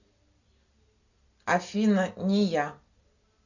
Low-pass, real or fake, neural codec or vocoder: 7.2 kHz; real; none